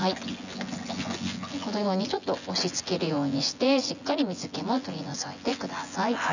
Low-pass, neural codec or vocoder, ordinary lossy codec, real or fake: 7.2 kHz; vocoder, 24 kHz, 100 mel bands, Vocos; none; fake